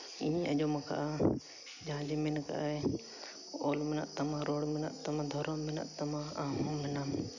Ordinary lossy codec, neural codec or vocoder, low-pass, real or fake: none; none; 7.2 kHz; real